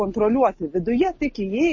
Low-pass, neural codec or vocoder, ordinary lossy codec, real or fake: 7.2 kHz; none; MP3, 32 kbps; real